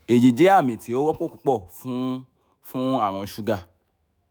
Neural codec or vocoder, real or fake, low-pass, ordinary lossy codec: autoencoder, 48 kHz, 128 numbers a frame, DAC-VAE, trained on Japanese speech; fake; none; none